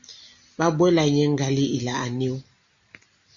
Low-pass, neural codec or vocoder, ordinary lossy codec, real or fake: 7.2 kHz; none; Opus, 64 kbps; real